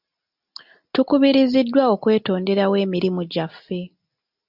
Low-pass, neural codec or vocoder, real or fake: 5.4 kHz; none; real